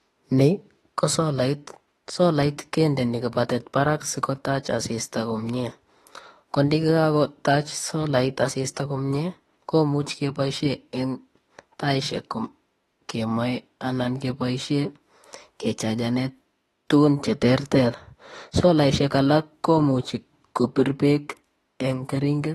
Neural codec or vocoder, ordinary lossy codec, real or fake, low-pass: autoencoder, 48 kHz, 32 numbers a frame, DAC-VAE, trained on Japanese speech; AAC, 32 kbps; fake; 19.8 kHz